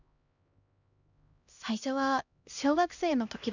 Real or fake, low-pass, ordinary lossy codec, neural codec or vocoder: fake; 7.2 kHz; none; codec, 16 kHz, 1 kbps, X-Codec, HuBERT features, trained on LibriSpeech